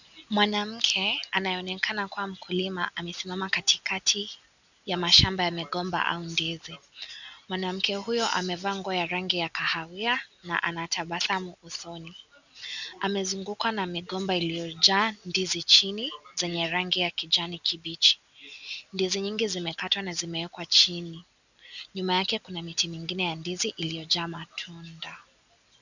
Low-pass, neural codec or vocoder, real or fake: 7.2 kHz; none; real